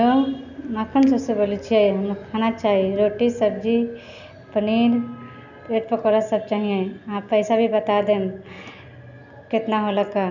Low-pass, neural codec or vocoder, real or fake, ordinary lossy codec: 7.2 kHz; none; real; none